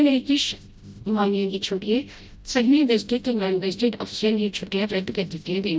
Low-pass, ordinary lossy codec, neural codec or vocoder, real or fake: none; none; codec, 16 kHz, 0.5 kbps, FreqCodec, smaller model; fake